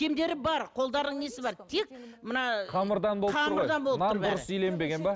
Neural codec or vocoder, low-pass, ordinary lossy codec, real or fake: none; none; none; real